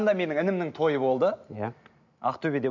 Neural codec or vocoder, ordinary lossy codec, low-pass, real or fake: none; none; 7.2 kHz; real